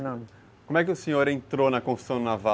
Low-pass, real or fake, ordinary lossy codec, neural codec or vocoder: none; real; none; none